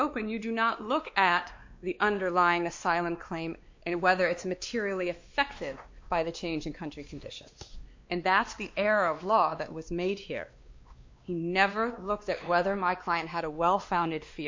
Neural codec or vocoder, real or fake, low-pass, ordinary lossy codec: codec, 16 kHz, 2 kbps, X-Codec, WavLM features, trained on Multilingual LibriSpeech; fake; 7.2 kHz; MP3, 48 kbps